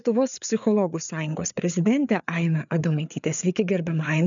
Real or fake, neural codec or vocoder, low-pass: fake; codec, 16 kHz, 4 kbps, FreqCodec, larger model; 7.2 kHz